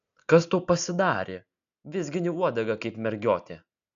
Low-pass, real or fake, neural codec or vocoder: 7.2 kHz; real; none